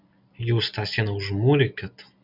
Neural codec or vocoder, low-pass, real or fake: none; 5.4 kHz; real